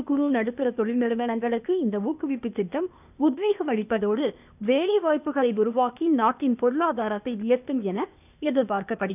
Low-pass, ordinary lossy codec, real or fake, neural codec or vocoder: 3.6 kHz; none; fake; codec, 16 kHz, 0.8 kbps, ZipCodec